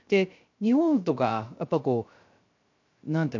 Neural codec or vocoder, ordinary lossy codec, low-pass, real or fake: codec, 16 kHz, 0.3 kbps, FocalCodec; MP3, 48 kbps; 7.2 kHz; fake